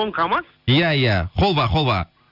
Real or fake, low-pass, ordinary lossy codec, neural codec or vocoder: real; 5.4 kHz; none; none